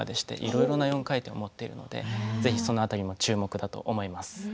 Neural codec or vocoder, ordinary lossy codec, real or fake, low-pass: none; none; real; none